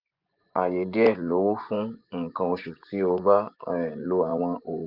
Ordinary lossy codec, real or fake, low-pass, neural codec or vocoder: none; fake; 5.4 kHz; vocoder, 24 kHz, 100 mel bands, Vocos